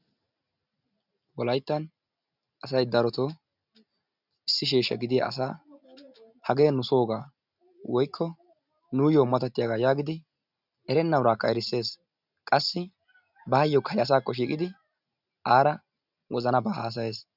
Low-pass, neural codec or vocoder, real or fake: 5.4 kHz; none; real